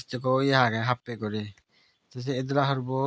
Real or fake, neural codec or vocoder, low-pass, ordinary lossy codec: real; none; none; none